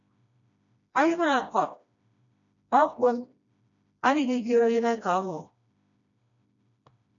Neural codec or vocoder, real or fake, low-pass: codec, 16 kHz, 1 kbps, FreqCodec, smaller model; fake; 7.2 kHz